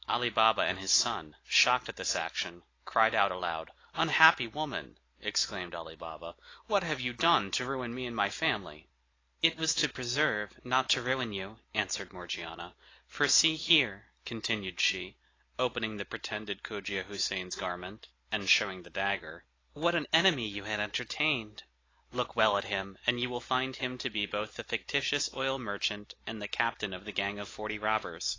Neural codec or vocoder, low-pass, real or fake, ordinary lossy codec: none; 7.2 kHz; real; AAC, 32 kbps